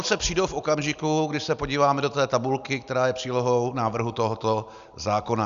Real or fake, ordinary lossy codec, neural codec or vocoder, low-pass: real; Opus, 64 kbps; none; 7.2 kHz